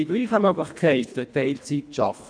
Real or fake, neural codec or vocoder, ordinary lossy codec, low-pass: fake; codec, 24 kHz, 1.5 kbps, HILCodec; none; 9.9 kHz